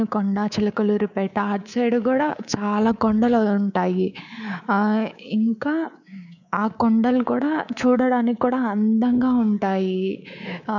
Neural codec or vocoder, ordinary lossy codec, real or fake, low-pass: codec, 24 kHz, 3.1 kbps, DualCodec; none; fake; 7.2 kHz